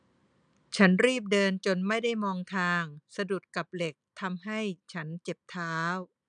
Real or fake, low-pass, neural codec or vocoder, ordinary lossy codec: real; 9.9 kHz; none; none